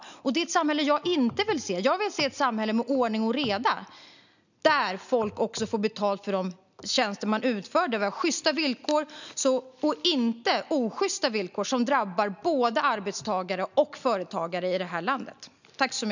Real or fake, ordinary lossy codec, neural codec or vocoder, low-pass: real; none; none; 7.2 kHz